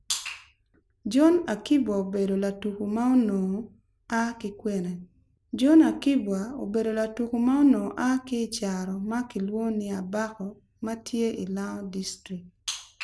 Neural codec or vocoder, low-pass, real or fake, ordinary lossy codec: none; none; real; none